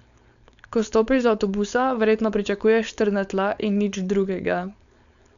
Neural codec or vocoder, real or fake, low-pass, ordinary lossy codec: codec, 16 kHz, 4.8 kbps, FACodec; fake; 7.2 kHz; none